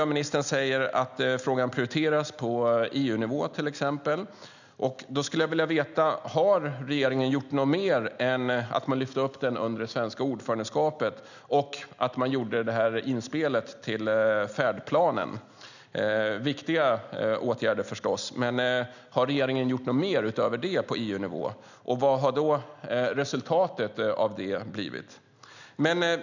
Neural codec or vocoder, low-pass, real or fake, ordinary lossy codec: none; 7.2 kHz; real; none